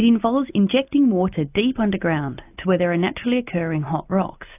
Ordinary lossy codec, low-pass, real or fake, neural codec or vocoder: AAC, 32 kbps; 3.6 kHz; real; none